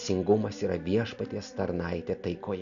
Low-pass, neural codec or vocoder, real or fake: 7.2 kHz; none; real